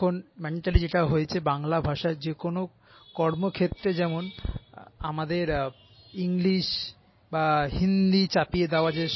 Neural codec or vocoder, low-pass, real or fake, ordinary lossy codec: none; 7.2 kHz; real; MP3, 24 kbps